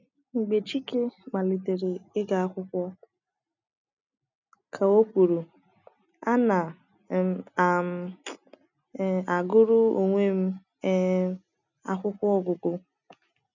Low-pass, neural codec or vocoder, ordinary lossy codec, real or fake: 7.2 kHz; none; none; real